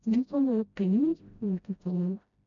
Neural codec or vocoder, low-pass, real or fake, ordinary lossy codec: codec, 16 kHz, 0.5 kbps, FreqCodec, smaller model; 7.2 kHz; fake; none